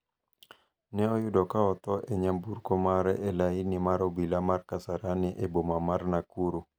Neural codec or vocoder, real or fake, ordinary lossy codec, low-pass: none; real; none; none